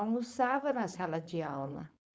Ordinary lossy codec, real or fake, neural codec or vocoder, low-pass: none; fake; codec, 16 kHz, 4.8 kbps, FACodec; none